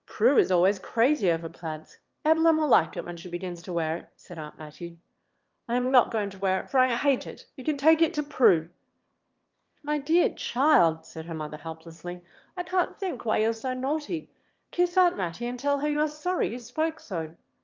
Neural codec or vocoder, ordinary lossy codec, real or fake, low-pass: autoencoder, 22.05 kHz, a latent of 192 numbers a frame, VITS, trained on one speaker; Opus, 24 kbps; fake; 7.2 kHz